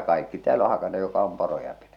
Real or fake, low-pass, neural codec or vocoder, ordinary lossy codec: real; 19.8 kHz; none; none